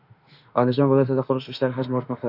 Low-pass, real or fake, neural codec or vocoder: 5.4 kHz; fake; autoencoder, 48 kHz, 32 numbers a frame, DAC-VAE, trained on Japanese speech